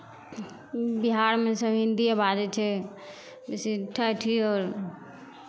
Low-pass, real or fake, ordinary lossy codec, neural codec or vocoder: none; real; none; none